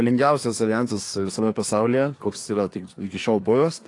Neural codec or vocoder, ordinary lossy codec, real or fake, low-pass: codec, 24 kHz, 1 kbps, SNAC; AAC, 48 kbps; fake; 10.8 kHz